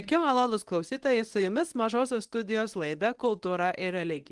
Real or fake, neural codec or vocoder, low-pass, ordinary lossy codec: fake; codec, 24 kHz, 0.9 kbps, WavTokenizer, medium speech release version 1; 10.8 kHz; Opus, 24 kbps